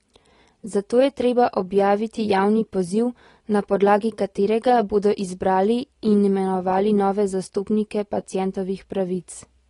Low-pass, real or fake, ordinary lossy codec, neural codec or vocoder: 10.8 kHz; real; AAC, 32 kbps; none